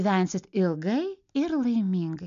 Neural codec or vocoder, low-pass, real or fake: none; 7.2 kHz; real